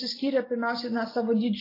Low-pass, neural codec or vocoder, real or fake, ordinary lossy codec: 5.4 kHz; none; real; AAC, 24 kbps